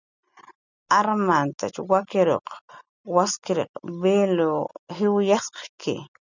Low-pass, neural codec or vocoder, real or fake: 7.2 kHz; none; real